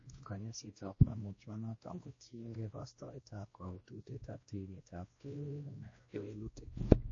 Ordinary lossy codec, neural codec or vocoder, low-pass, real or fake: MP3, 32 kbps; codec, 16 kHz, 1 kbps, X-Codec, WavLM features, trained on Multilingual LibriSpeech; 7.2 kHz; fake